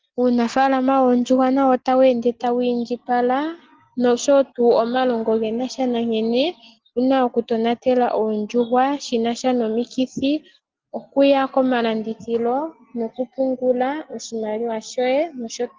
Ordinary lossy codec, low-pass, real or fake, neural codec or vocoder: Opus, 16 kbps; 7.2 kHz; real; none